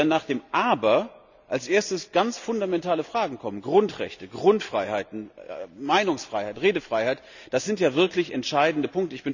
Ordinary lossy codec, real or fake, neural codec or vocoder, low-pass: none; real; none; 7.2 kHz